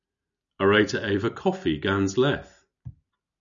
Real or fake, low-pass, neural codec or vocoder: real; 7.2 kHz; none